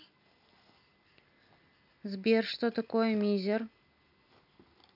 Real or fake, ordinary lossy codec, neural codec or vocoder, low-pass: real; none; none; 5.4 kHz